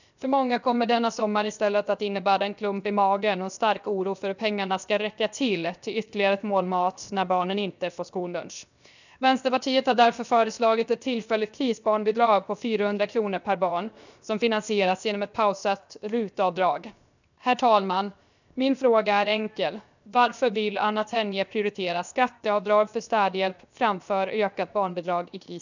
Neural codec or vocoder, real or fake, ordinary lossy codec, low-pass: codec, 16 kHz, 0.7 kbps, FocalCodec; fake; none; 7.2 kHz